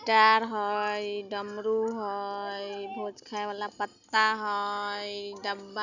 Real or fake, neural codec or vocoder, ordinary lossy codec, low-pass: real; none; none; 7.2 kHz